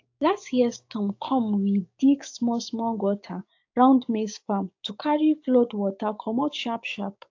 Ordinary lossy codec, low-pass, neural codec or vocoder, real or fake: AAC, 48 kbps; 7.2 kHz; codec, 16 kHz, 6 kbps, DAC; fake